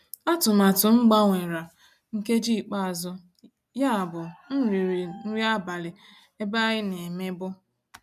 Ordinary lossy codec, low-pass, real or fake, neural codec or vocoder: none; 14.4 kHz; real; none